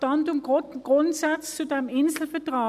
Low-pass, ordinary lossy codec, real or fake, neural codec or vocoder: 14.4 kHz; none; real; none